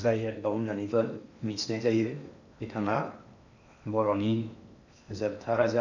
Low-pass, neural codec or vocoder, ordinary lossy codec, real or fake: 7.2 kHz; codec, 16 kHz in and 24 kHz out, 0.8 kbps, FocalCodec, streaming, 65536 codes; none; fake